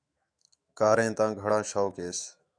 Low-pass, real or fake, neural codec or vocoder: 9.9 kHz; fake; autoencoder, 48 kHz, 128 numbers a frame, DAC-VAE, trained on Japanese speech